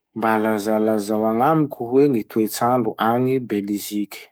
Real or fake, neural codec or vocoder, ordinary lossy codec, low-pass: fake; codec, 44.1 kHz, 7.8 kbps, DAC; none; none